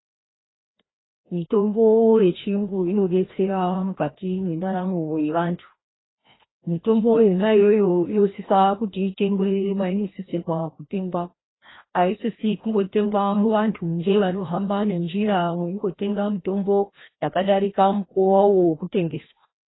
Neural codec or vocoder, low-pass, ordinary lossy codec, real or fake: codec, 16 kHz, 1 kbps, FreqCodec, larger model; 7.2 kHz; AAC, 16 kbps; fake